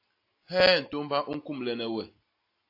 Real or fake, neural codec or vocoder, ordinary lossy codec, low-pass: real; none; AAC, 32 kbps; 5.4 kHz